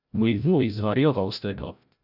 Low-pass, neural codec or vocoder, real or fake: 5.4 kHz; codec, 16 kHz, 0.5 kbps, FreqCodec, larger model; fake